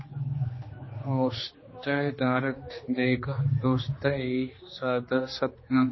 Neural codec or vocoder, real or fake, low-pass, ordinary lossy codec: codec, 16 kHz, 2 kbps, X-Codec, HuBERT features, trained on general audio; fake; 7.2 kHz; MP3, 24 kbps